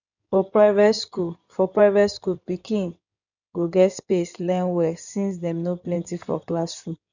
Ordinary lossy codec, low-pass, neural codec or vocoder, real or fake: none; 7.2 kHz; codec, 16 kHz in and 24 kHz out, 2.2 kbps, FireRedTTS-2 codec; fake